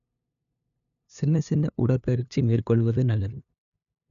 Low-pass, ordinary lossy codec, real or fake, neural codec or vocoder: 7.2 kHz; none; fake; codec, 16 kHz, 2 kbps, FunCodec, trained on LibriTTS, 25 frames a second